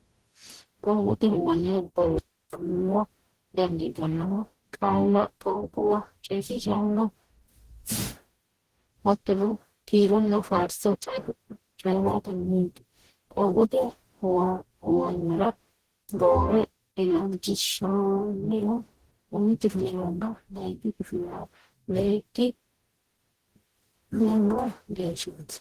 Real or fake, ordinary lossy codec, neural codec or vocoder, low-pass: fake; Opus, 16 kbps; codec, 44.1 kHz, 0.9 kbps, DAC; 14.4 kHz